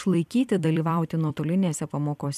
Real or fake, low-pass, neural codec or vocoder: fake; 14.4 kHz; vocoder, 44.1 kHz, 128 mel bands every 256 samples, BigVGAN v2